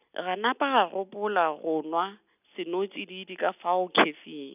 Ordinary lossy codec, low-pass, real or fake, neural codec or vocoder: none; 3.6 kHz; real; none